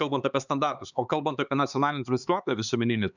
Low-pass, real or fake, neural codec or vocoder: 7.2 kHz; fake; codec, 16 kHz, 4 kbps, X-Codec, HuBERT features, trained on LibriSpeech